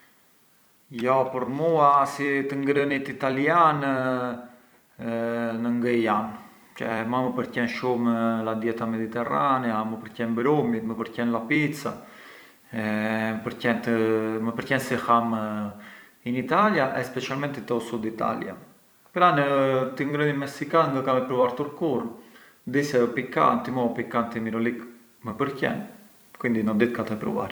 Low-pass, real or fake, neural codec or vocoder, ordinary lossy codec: none; fake; vocoder, 44.1 kHz, 128 mel bands every 256 samples, BigVGAN v2; none